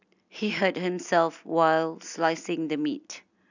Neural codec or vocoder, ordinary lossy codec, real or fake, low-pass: none; none; real; 7.2 kHz